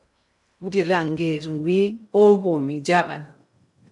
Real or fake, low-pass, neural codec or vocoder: fake; 10.8 kHz; codec, 16 kHz in and 24 kHz out, 0.6 kbps, FocalCodec, streaming, 2048 codes